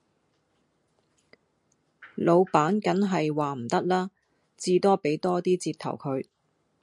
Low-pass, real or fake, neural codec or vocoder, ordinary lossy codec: 10.8 kHz; real; none; MP3, 64 kbps